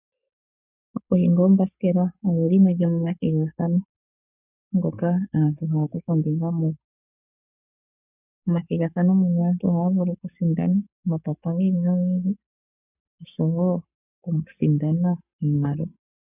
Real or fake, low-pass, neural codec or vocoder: fake; 3.6 kHz; codec, 44.1 kHz, 7.8 kbps, Pupu-Codec